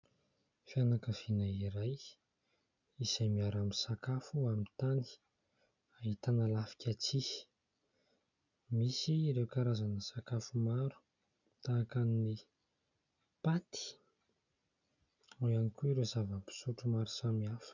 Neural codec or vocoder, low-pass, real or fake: none; 7.2 kHz; real